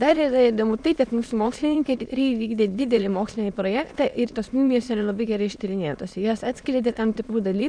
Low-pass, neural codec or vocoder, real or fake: 9.9 kHz; autoencoder, 22.05 kHz, a latent of 192 numbers a frame, VITS, trained on many speakers; fake